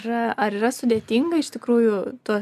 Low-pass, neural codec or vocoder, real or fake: 14.4 kHz; none; real